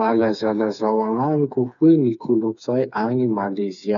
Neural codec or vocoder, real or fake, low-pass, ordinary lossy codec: codec, 16 kHz, 4 kbps, FreqCodec, smaller model; fake; 7.2 kHz; none